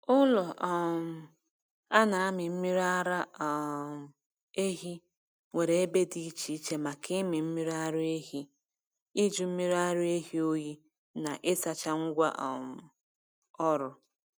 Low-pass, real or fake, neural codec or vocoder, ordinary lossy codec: none; real; none; none